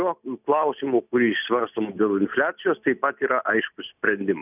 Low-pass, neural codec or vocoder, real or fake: 3.6 kHz; none; real